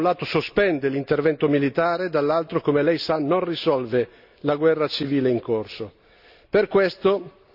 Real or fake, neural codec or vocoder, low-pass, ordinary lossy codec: real; none; 5.4 kHz; none